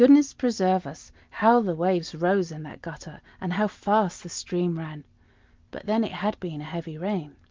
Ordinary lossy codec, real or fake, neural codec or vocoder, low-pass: Opus, 24 kbps; real; none; 7.2 kHz